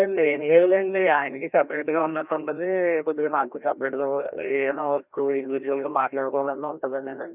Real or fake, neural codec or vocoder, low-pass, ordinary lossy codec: fake; codec, 16 kHz, 1 kbps, FreqCodec, larger model; 3.6 kHz; none